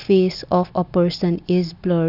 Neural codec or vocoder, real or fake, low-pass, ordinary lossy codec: none; real; 5.4 kHz; none